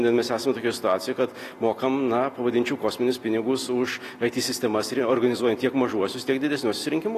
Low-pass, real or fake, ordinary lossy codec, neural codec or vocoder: 14.4 kHz; real; AAC, 48 kbps; none